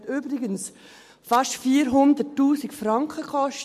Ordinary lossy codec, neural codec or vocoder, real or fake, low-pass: MP3, 64 kbps; none; real; 14.4 kHz